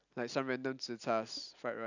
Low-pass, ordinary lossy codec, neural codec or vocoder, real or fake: 7.2 kHz; none; none; real